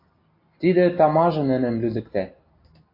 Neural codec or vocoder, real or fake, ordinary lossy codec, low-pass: none; real; MP3, 32 kbps; 5.4 kHz